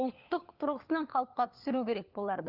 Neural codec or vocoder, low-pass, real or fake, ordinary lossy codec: codec, 16 kHz, 4 kbps, FunCodec, trained on LibriTTS, 50 frames a second; 5.4 kHz; fake; Opus, 24 kbps